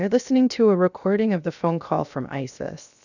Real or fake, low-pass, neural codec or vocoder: fake; 7.2 kHz; codec, 16 kHz, 0.7 kbps, FocalCodec